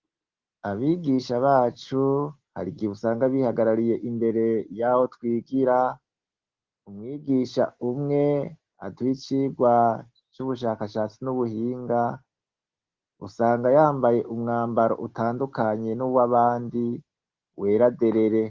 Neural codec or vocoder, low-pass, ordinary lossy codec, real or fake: none; 7.2 kHz; Opus, 16 kbps; real